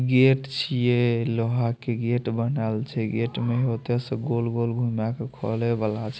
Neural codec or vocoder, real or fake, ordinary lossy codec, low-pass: none; real; none; none